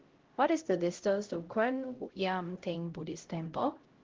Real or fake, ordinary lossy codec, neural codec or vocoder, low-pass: fake; Opus, 16 kbps; codec, 16 kHz, 0.5 kbps, X-Codec, HuBERT features, trained on LibriSpeech; 7.2 kHz